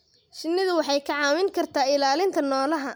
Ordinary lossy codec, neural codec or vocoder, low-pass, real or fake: none; none; none; real